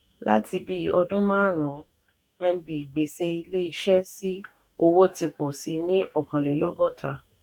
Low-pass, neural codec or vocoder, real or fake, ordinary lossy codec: 19.8 kHz; codec, 44.1 kHz, 2.6 kbps, DAC; fake; none